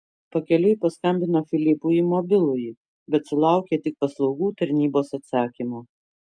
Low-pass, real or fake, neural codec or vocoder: 9.9 kHz; real; none